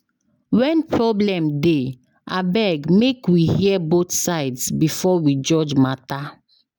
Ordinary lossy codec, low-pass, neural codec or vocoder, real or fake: none; none; none; real